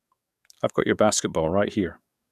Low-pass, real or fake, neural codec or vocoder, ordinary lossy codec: 14.4 kHz; fake; codec, 44.1 kHz, 7.8 kbps, DAC; none